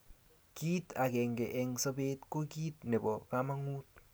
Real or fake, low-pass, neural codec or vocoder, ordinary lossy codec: real; none; none; none